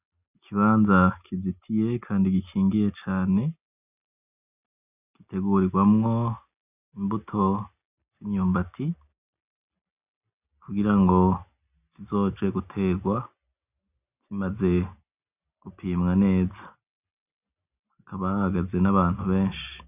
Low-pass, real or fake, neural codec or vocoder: 3.6 kHz; real; none